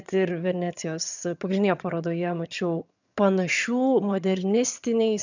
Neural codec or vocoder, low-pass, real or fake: vocoder, 22.05 kHz, 80 mel bands, HiFi-GAN; 7.2 kHz; fake